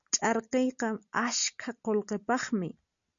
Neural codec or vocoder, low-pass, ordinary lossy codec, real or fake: none; 7.2 kHz; MP3, 96 kbps; real